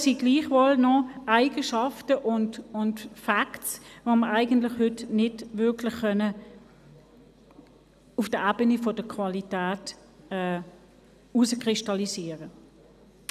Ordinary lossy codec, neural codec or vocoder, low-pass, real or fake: none; none; 14.4 kHz; real